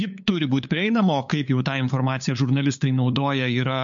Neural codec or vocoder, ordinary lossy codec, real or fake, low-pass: codec, 16 kHz, 8 kbps, FunCodec, trained on LibriTTS, 25 frames a second; MP3, 48 kbps; fake; 7.2 kHz